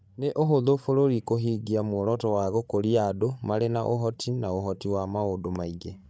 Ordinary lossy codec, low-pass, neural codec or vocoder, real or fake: none; none; codec, 16 kHz, 16 kbps, FreqCodec, larger model; fake